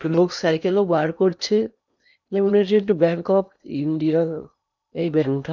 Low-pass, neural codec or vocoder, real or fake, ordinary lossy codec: 7.2 kHz; codec, 16 kHz in and 24 kHz out, 0.8 kbps, FocalCodec, streaming, 65536 codes; fake; none